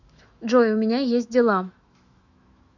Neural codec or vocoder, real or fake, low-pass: autoencoder, 48 kHz, 128 numbers a frame, DAC-VAE, trained on Japanese speech; fake; 7.2 kHz